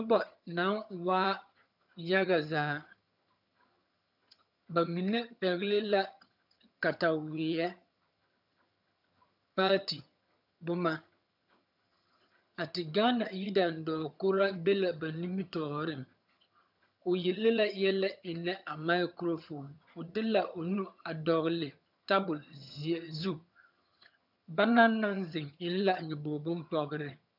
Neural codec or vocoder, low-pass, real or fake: vocoder, 22.05 kHz, 80 mel bands, HiFi-GAN; 5.4 kHz; fake